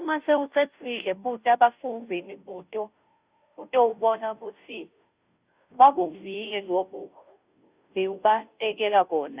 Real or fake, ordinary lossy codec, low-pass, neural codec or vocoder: fake; Opus, 64 kbps; 3.6 kHz; codec, 16 kHz, 0.5 kbps, FunCodec, trained on Chinese and English, 25 frames a second